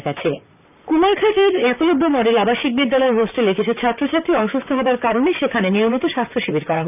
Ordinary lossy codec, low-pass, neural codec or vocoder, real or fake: none; 3.6 kHz; vocoder, 44.1 kHz, 128 mel bands, Pupu-Vocoder; fake